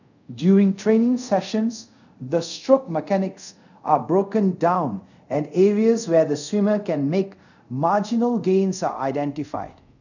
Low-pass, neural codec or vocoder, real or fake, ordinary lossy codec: 7.2 kHz; codec, 24 kHz, 0.5 kbps, DualCodec; fake; none